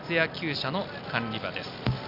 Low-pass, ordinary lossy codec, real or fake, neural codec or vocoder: 5.4 kHz; none; real; none